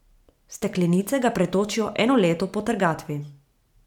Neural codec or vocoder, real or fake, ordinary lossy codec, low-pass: vocoder, 44.1 kHz, 128 mel bands every 512 samples, BigVGAN v2; fake; none; 19.8 kHz